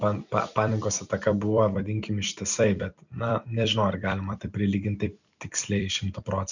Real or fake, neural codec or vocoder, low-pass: fake; vocoder, 44.1 kHz, 128 mel bands every 256 samples, BigVGAN v2; 7.2 kHz